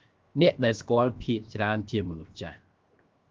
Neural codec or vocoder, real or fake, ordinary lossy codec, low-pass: codec, 16 kHz, 0.7 kbps, FocalCodec; fake; Opus, 32 kbps; 7.2 kHz